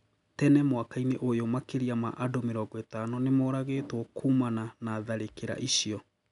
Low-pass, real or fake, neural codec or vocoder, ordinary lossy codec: 10.8 kHz; real; none; none